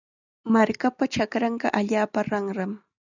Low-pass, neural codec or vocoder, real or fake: 7.2 kHz; none; real